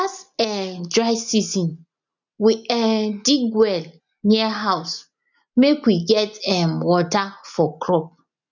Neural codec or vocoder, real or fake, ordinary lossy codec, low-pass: none; real; none; 7.2 kHz